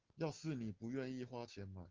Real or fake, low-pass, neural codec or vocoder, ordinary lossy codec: real; 7.2 kHz; none; Opus, 16 kbps